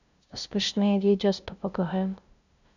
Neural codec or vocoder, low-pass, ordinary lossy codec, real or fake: codec, 16 kHz, 0.5 kbps, FunCodec, trained on LibriTTS, 25 frames a second; 7.2 kHz; none; fake